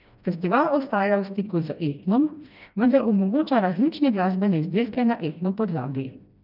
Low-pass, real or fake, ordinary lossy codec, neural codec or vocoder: 5.4 kHz; fake; none; codec, 16 kHz, 1 kbps, FreqCodec, smaller model